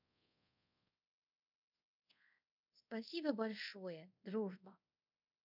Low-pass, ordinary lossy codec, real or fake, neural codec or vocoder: 5.4 kHz; none; fake; codec, 24 kHz, 0.5 kbps, DualCodec